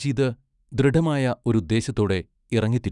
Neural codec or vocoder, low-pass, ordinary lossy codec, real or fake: autoencoder, 48 kHz, 128 numbers a frame, DAC-VAE, trained on Japanese speech; 10.8 kHz; none; fake